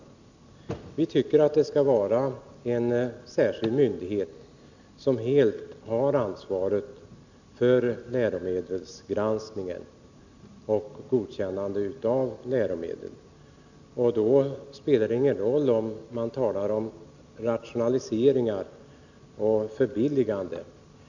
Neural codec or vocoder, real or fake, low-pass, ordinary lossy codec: none; real; 7.2 kHz; none